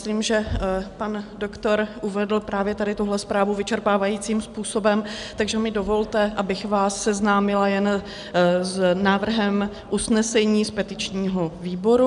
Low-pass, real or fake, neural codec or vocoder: 10.8 kHz; real; none